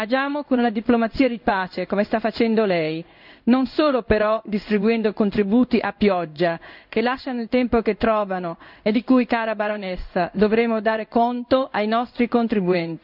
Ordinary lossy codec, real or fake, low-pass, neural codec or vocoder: none; fake; 5.4 kHz; codec, 16 kHz in and 24 kHz out, 1 kbps, XY-Tokenizer